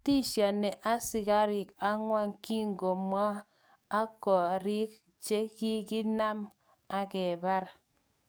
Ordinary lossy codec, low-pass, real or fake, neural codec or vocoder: none; none; fake; codec, 44.1 kHz, 7.8 kbps, DAC